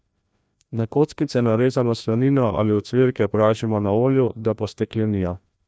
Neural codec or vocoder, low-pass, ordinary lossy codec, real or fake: codec, 16 kHz, 1 kbps, FreqCodec, larger model; none; none; fake